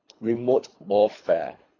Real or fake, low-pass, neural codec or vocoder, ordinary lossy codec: fake; 7.2 kHz; codec, 24 kHz, 3 kbps, HILCodec; AAC, 32 kbps